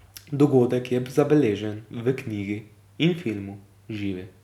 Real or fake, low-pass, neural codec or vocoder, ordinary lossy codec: real; 19.8 kHz; none; none